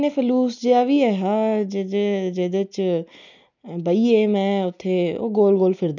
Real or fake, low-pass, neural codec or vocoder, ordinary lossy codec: real; 7.2 kHz; none; none